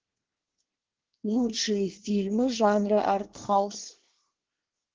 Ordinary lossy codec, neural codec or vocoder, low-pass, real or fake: Opus, 16 kbps; codec, 24 kHz, 1 kbps, SNAC; 7.2 kHz; fake